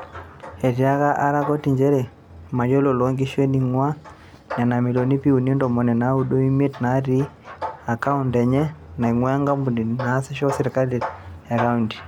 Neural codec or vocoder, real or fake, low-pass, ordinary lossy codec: none; real; 19.8 kHz; none